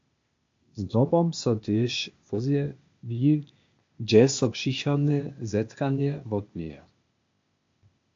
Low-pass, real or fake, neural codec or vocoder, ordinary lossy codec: 7.2 kHz; fake; codec, 16 kHz, 0.8 kbps, ZipCodec; MP3, 48 kbps